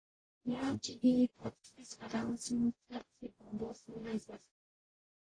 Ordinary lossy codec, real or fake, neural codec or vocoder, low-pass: AAC, 32 kbps; fake; codec, 44.1 kHz, 0.9 kbps, DAC; 9.9 kHz